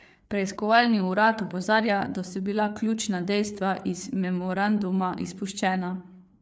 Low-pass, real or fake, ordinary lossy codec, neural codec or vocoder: none; fake; none; codec, 16 kHz, 4 kbps, FreqCodec, larger model